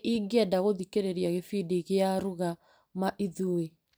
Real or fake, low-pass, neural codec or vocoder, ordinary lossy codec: fake; none; vocoder, 44.1 kHz, 128 mel bands every 256 samples, BigVGAN v2; none